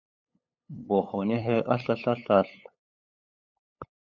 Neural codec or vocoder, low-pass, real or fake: codec, 16 kHz, 8 kbps, FunCodec, trained on LibriTTS, 25 frames a second; 7.2 kHz; fake